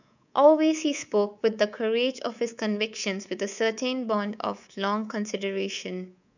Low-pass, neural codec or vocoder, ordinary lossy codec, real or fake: 7.2 kHz; codec, 24 kHz, 3.1 kbps, DualCodec; none; fake